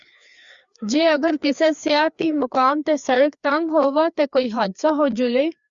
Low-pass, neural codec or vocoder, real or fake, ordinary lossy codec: 7.2 kHz; codec, 16 kHz, 2 kbps, FreqCodec, larger model; fake; Opus, 64 kbps